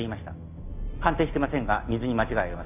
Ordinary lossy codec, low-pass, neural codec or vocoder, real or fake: none; 3.6 kHz; none; real